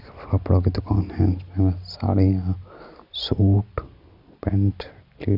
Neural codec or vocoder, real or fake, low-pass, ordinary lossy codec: none; real; 5.4 kHz; none